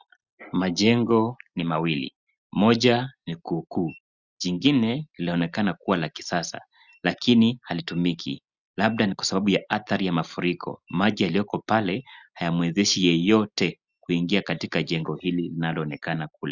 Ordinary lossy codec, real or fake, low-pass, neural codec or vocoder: Opus, 64 kbps; real; 7.2 kHz; none